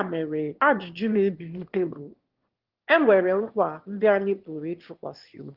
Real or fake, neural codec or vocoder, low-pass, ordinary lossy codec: fake; autoencoder, 22.05 kHz, a latent of 192 numbers a frame, VITS, trained on one speaker; 5.4 kHz; Opus, 16 kbps